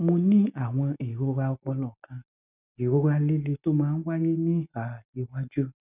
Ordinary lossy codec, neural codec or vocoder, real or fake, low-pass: none; none; real; 3.6 kHz